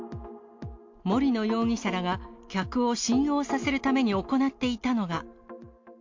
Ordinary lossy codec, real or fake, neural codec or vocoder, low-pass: MP3, 48 kbps; real; none; 7.2 kHz